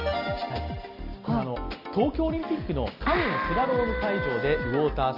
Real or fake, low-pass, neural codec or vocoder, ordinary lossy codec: real; 5.4 kHz; none; Opus, 32 kbps